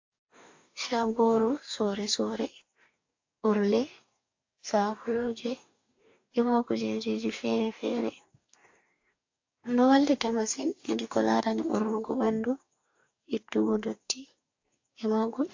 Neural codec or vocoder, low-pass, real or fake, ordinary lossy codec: codec, 44.1 kHz, 2.6 kbps, DAC; 7.2 kHz; fake; AAC, 48 kbps